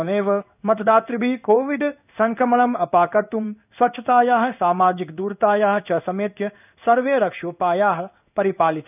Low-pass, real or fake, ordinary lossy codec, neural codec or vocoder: 3.6 kHz; fake; none; codec, 16 kHz in and 24 kHz out, 1 kbps, XY-Tokenizer